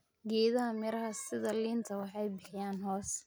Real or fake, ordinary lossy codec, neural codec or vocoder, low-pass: real; none; none; none